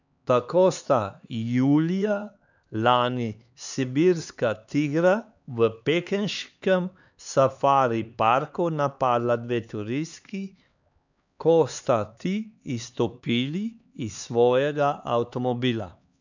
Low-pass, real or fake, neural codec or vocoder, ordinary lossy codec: 7.2 kHz; fake; codec, 16 kHz, 4 kbps, X-Codec, HuBERT features, trained on LibriSpeech; none